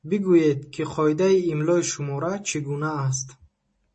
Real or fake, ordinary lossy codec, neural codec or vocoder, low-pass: real; MP3, 32 kbps; none; 9.9 kHz